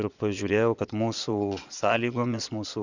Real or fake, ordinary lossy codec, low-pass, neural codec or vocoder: fake; Opus, 64 kbps; 7.2 kHz; vocoder, 22.05 kHz, 80 mel bands, Vocos